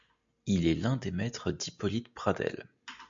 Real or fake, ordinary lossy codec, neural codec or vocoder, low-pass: real; MP3, 64 kbps; none; 7.2 kHz